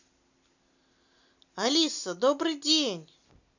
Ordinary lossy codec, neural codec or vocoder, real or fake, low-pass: none; none; real; 7.2 kHz